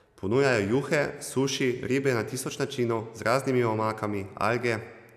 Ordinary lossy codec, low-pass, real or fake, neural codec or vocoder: none; 14.4 kHz; real; none